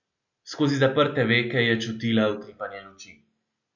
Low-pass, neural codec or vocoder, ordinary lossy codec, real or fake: 7.2 kHz; none; none; real